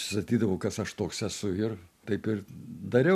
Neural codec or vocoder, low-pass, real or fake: none; 14.4 kHz; real